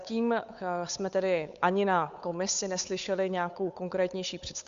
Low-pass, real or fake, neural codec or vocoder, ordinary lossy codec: 7.2 kHz; fake; codec, 16 kHz, 8 kbps, FunCodec, trained on Chinese and English, 25 frames a second; Opus, 64 kbps